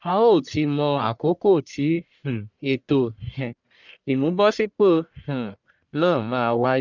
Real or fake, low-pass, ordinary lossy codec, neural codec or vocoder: fake; 7.2 kHz; none; codec, 44.1 kHz, 1.7 kbps, Pupu-Codec